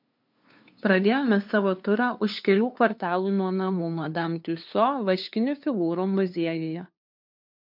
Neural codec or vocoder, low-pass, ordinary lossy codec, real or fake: codec, 16 kHz, 2 kbps, FunCodec, trained on LibriTTS, 25 frames a second; 5.4 kHz; MP3, 48 kbps; fake